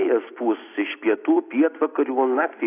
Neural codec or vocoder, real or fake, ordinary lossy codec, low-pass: none; real; AAC, 24 kbps; 3.6 kHz